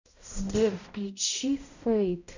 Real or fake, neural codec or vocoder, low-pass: fake; codec, 16 kHz, 0.5 kbps, X-Codec, HuBERT features, trained on balanced general audio; 7.2 kHz